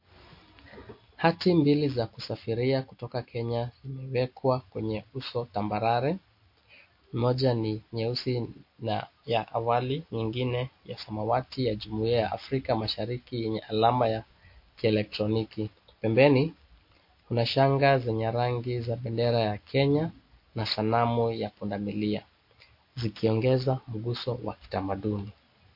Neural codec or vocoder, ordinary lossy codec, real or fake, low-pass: none; MP3, 32 kbps; real; 5.4 kHz